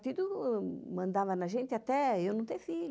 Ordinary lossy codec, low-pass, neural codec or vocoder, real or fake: none; none; none; real